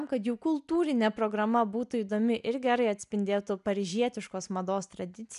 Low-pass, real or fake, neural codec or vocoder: 10.8 kHz; real; none